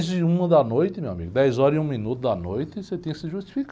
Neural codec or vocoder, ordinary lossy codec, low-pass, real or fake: none; none; none; real